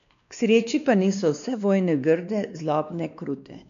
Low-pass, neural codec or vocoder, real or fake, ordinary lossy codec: 7.2 kHz; codec, 16 kHz, 2 kbps, X-Codec, WavLM features, trained on Multilingual LibriSpeech; fake; none